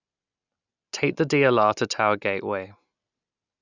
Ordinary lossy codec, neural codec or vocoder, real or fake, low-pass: none; none; real; 7.2 kHz